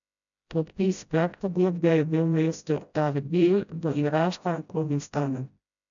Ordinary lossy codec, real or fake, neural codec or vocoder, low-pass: none; fake; codec, 16 kHz, 0.5 kbps, FreqCodec, smaller model; 7.2 kHz